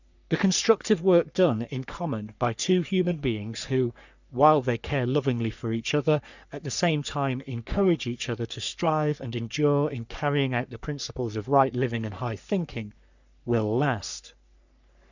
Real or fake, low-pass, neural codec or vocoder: fake; 7.2 kHz; codec, 44.1 kHz, 3.4 kbps, Pupu-Codec